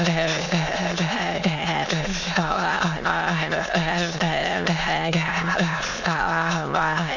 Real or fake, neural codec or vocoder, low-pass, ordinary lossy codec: fake; autoencoder, 22.05 kHz, a latent of 192 numbers a frame, VITS, trained on many speakers; 7.2 kHz; none